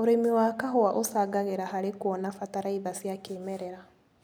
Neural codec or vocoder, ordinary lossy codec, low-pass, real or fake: none; none; none; real